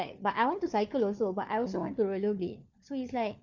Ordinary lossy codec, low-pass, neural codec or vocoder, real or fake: none; 7.2 kHz; codec, 16 kHz, 4 kbps, FunCodec, trained on LibriTTS, 50 frames a second; fake